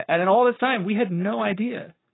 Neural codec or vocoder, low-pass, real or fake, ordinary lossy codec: codec, 44.1 kHz, 7.8 kbps, Pupu-Codec; 7.2 kHz; fake; AAC, 16 kbps